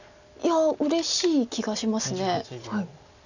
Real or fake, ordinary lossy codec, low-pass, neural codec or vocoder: real; none; 7.2 kHz; none